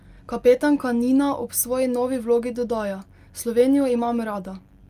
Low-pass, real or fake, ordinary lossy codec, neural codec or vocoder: 14.4 kHz; real; Opus, 32 kbps; none